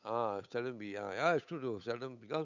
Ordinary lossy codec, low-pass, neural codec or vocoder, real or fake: none; 7.2 kHz; codec, 16 kHz, 8 kbps, FunCodec, trained on Chinese and English, 25 frames a second; fake